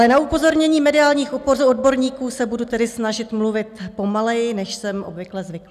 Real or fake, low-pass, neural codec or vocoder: real; 14.4 kHz; none